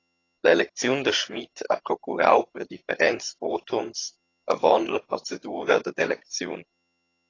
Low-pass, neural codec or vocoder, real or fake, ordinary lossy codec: 7.2 kHz; vocoder, 22.05 kHz, 80 mel bands, HiFi-GAN; fake; AAC, 32 kbps